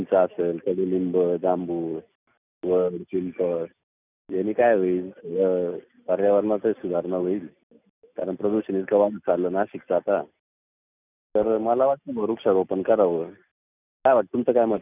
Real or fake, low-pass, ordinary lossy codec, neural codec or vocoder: real; 3.6 kHz; none; none